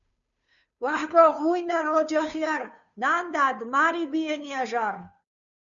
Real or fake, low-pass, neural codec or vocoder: fake; 7.2 kHz; codec, 16 kHz, 2 kbps, FunCodec, trained on Chinese and English, 25 frames a second